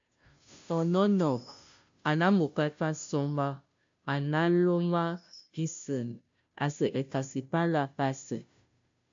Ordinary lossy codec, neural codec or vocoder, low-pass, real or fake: AAC, 64 kbps; codec, 16 kHz, 0.5 kbps, FunCodec, trained on Chinese and English, 25 frames a second; 7.2 kHz; fake